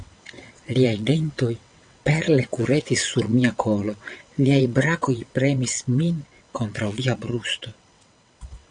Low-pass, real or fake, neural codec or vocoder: 9.9 kHz; fake; vocoder, 22.05 kHz, 80 mel bands, WaveNeXt